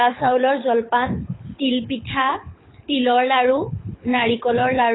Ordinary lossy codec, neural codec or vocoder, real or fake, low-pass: AAC, 16 kbps; codec, 24 kHz, 6 kbps, HILCodec; fake; 7.2 kHz